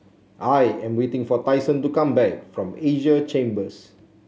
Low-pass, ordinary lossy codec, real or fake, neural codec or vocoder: none; none; real; none